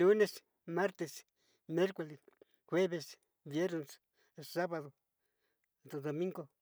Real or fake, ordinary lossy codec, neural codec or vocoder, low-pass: real; none; none; none